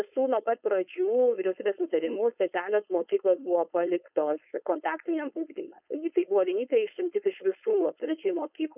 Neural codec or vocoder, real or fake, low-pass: codec, 16 kHz, 4.8 kbps, FACodec; fake; 3.6 kHz